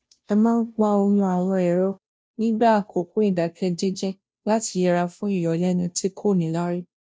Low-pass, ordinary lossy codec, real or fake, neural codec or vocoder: none; none; fake; codec, 16 kHz, 0.5 kbps, FunCodec, trained on Chinese and English, 25 frames a second